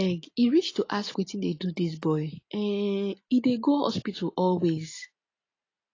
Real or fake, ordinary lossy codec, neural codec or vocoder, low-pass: real; AAC, 32 kbps; none; 7.2 kHz